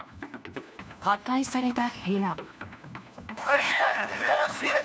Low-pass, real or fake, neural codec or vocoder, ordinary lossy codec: none; fake; codec, 16 kHz, 1 kbps, FunCodec, trained on LibriTTS, 50 frames a second; none